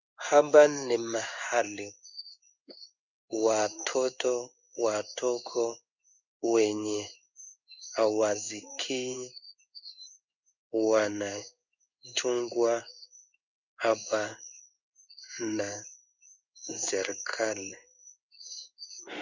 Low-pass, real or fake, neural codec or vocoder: 7.2 kHz; fake; codec, 16 kHz in and 24 kHz out, 1 kbps, XY-Tokenizer